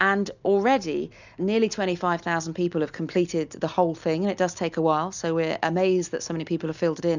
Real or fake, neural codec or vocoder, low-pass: real; none; 7.2 kHz